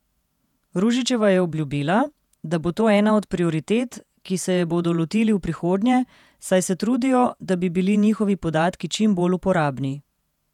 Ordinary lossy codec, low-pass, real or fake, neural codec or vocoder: none; 19.8 kHz; fake; vocoder, 48 kHz, 128 mel bands, Vocos